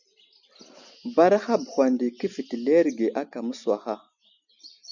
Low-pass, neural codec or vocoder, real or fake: 7.2 kHz; none; real